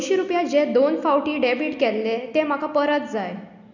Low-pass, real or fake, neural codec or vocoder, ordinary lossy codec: 7.2 kHz; real; none; none